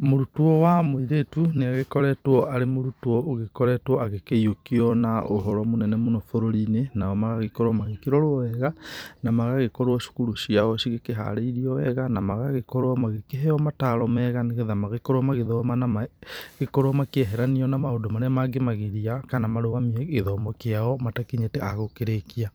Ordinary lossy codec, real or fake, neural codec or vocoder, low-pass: none; fake; vocoder, 44.1 kHz, 128 mel bands every 256 samples, BigVGAN v2; none